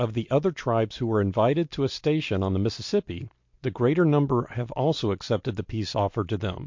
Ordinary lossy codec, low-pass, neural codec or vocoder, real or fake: MP3, 48 kbps; 7.2 kHz; none; real